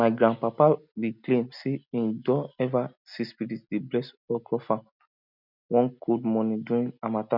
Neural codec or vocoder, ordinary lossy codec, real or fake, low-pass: none; none; real; 5.4 kHz